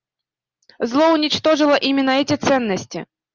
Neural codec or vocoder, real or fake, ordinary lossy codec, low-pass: none; real; Opus, 32 kbps; 7.2 kHz